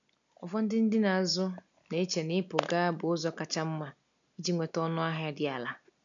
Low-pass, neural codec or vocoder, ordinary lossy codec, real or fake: 7.2 kHz; none; none; real